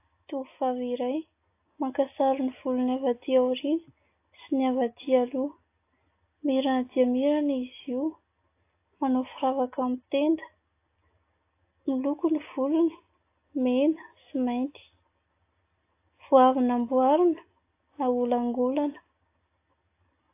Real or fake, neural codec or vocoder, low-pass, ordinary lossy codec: real; none; 3.6 kHz; AAC, 24 kbps